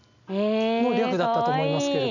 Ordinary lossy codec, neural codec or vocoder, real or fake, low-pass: none; none; real; 7.2 kHz